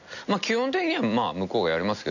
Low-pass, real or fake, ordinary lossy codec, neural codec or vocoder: 7.2 kHz; real; none; none